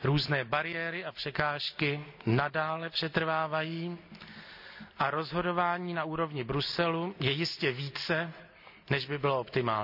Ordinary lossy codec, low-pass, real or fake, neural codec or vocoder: none; 5.4 kHz; real; none